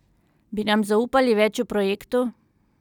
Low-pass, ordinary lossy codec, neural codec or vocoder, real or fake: 19.8 kHz; none; none; real